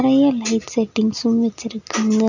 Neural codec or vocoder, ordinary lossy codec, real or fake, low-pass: none; none; real; 7.2 kHz